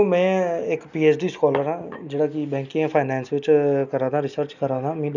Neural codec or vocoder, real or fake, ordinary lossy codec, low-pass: none; real; none; 7.2 kHz